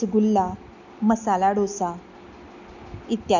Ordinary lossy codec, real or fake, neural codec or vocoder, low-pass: none; real; none; 7.2 kHz